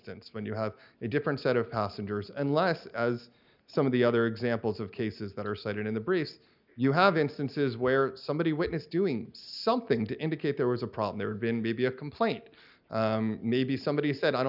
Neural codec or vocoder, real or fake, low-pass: none; real; 5.4 kHz